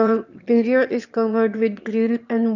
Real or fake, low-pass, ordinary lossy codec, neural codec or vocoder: fake; 7.2 kHz; none; autoencoder, 22.05 kHz, a latent of 192 numbers a frame, VITS, trained on one speaker